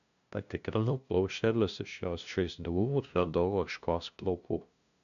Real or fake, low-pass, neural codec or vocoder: fake; 7.2 kHz; codec, 16 kHz, 0.5 kbps, FunCodec, trained on LibriTTS, 25 frames a second